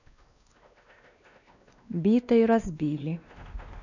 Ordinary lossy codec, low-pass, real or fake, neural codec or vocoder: none; 7.2 kHz; fake; codec, 16 kHz, 2 kbps, X-Codec, WavLM features, trained on Multilingual LibriSpeech